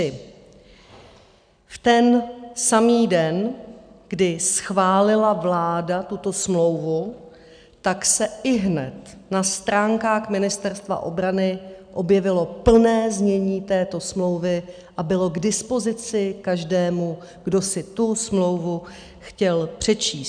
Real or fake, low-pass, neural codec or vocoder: real; 9.9 kHz; none